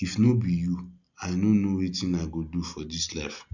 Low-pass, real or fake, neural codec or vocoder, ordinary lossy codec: 7.2 kHz; real; none; none